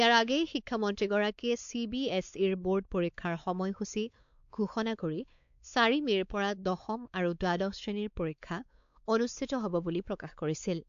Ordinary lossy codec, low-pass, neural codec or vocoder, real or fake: AAC, 64 kbps; 7.2 kHz; codec, 16 kHz, 4 kbps, X-Codec, WavLM features, trained on Multilingual LibriSpeech; fake